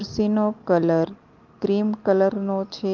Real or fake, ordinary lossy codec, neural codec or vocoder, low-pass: real; Opus, 32 kbps; none; 7.2 kHz